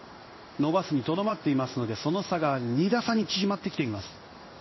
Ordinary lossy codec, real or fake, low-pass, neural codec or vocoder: MP3, 24 kbps; fake; 7.2 kHz; codec, 16 kHz in and 24 kHz out, 1 kbps, XY-Tokenizer